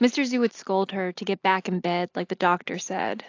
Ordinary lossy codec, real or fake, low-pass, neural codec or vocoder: AAC, 48 kbps; real; 7.2 kHz; none